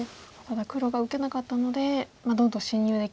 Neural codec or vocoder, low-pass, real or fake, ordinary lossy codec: none; none; real; none